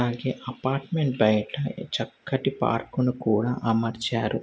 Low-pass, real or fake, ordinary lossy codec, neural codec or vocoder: none; real; none; none